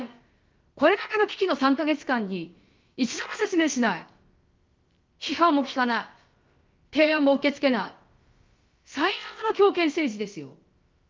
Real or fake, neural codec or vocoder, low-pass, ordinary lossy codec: fake; codec, 16 kHz, about 1 kbps, DyCAST, with the encoder's durations; 7.2 kHz; Opus, 32 kbps